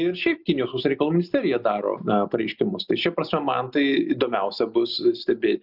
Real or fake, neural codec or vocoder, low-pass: real; none; 5.4 kHz